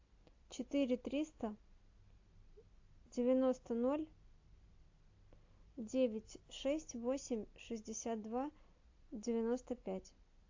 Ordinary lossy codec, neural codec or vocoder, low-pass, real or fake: AAC, 48 kbps; none; 7.2 kHz; real